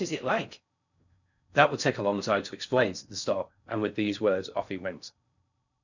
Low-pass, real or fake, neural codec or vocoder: 7.2 kHz; fake; codec, 16 kHz in and 24 kHz out, 0.6 kbps, FocalCodec, streaming, 4096 codes